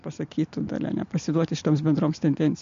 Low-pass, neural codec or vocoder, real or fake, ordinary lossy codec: 7.2 kHz; none; real; MP3, 48 kbps